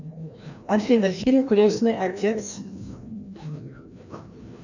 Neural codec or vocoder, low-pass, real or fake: codec, 16 kHz, 1 kbps, FreqCodec, larger model; 7.2 kHz; fake